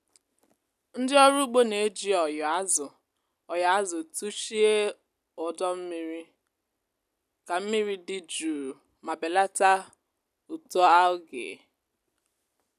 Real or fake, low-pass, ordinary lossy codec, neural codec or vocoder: real; 14.4 kHz; none; none